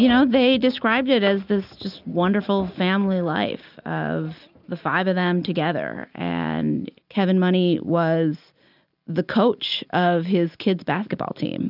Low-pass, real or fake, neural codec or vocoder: 5.4 kHz; real; none